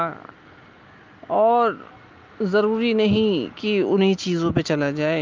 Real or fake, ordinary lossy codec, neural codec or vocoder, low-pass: real; Opus, 24 kbps; none; 7.2 kHz